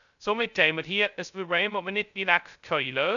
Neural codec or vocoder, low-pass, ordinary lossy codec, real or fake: codec, 16 kHz, 0.2 kbps, FocalCodec; 7.2 kHz; none; fake